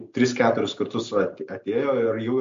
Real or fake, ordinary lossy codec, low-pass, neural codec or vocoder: real; MP3, 48 kbps; 7.2 kHz; none